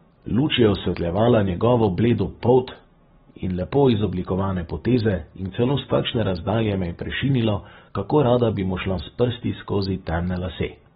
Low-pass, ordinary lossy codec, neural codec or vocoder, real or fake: 19.8 kHz; AAC, 16 kbps; none; real